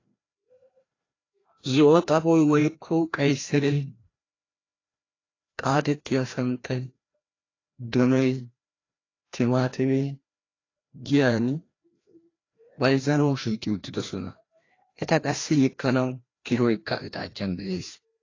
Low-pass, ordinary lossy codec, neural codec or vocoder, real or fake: 7.2 kHz; AAC, 32 kbps; codec, 16 kHz, 1 kbps, FreqCodec, larger model; fake